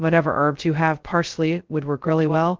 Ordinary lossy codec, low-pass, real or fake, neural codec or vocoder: Opus, 16 kbps; 7.2 kHz; fake; codec, 16 kHz, 0.2 kbps, FocalCodec